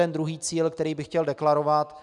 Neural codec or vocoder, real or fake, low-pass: none; real; 10.8 kHz